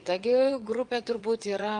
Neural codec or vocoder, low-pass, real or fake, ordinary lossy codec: vocoder, 22.05 kHz, 80 mel bands, WaveNeXt; 9.9 kHz; fake; Opus, 32 kbps